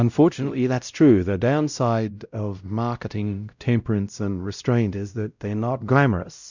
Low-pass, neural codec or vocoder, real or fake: 7.2 kHz; codec, 16 kHz, 0.5 kbps, X-Codec, WavLM features, trained on Multilingual LibriSpeech; fake